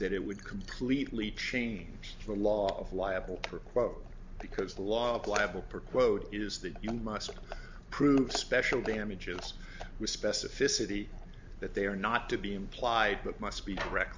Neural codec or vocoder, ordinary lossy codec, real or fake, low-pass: none; MP3, 64 kbps; real; 7.2 kHz